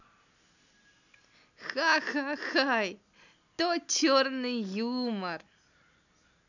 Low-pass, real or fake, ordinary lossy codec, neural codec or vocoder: 7.2 kHz; real; none; none